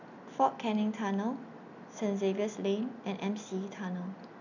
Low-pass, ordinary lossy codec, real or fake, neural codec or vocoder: 7.2 kHz; none; real; none